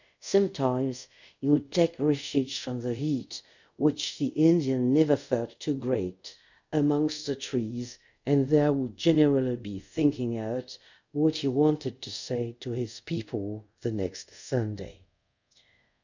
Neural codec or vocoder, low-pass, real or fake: codec, 24 kHz, 0.5 kbps, DualCodec; 7.2 kHz; fake